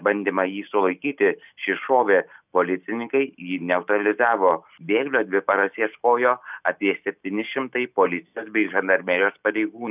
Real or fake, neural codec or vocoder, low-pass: real; none; 3.6 kHz